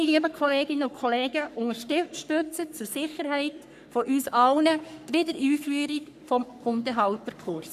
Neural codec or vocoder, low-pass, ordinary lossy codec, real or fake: codec, 44.1 kHz, 3.4 kbps, Pupu-Codec; 14.4 kHz; none; fake